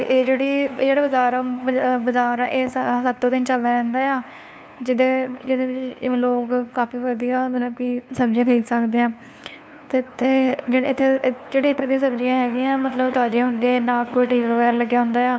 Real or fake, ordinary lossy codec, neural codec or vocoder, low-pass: fake; none; codec, 16 kHz, 2 kbps, FunCodec, trained on LibriTTS, 25 frames a second; none